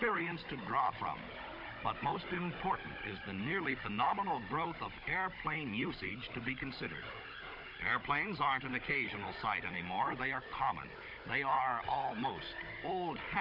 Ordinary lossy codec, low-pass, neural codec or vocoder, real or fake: MP3, 32 kbps; 5.4 kHz; codec, 16 kHz, 16 kbps, FunCodec, trained on Chinese and English, 50 frames a second; fake